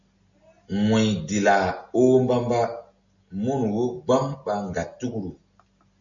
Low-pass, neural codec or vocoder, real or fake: 7.2 kHz; none; real